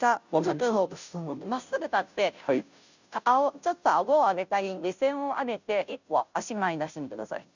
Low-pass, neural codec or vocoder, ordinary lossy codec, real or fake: 7.2 kHz; codec, 16 kHz, 0.5 kbps, FunCodec, trained on Chinese and English, 25 frames a second; none; fake